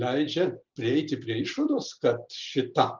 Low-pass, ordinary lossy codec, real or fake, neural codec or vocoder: 7.2 kHz; Opus, 24 kbps; real; none